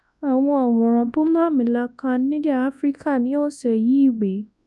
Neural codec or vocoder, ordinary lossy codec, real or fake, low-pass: codec, 24 kHz, 0.9 kbps, WavTokenizer, large speech release; none; fake; none